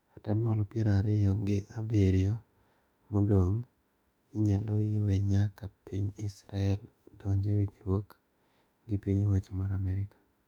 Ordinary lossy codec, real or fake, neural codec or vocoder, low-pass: none; fake; autoencoder, 48 kHz, 32 numbers a frame, DAC-VAE, trained on Japanese speech; 19.8 kHz